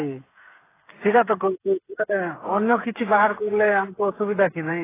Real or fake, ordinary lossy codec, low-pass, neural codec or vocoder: fake; AAC, 16 kbps; 3.6 kHz; codec, 16 kHz, 8 kbps, FreqCodec, smaller model